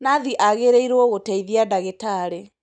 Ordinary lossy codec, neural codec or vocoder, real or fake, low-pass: none; none; real; 9.9 kHz